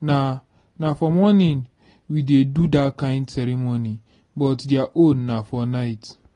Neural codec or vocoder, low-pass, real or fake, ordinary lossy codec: none; 10.8 kHz; real; AAC, 32 kbps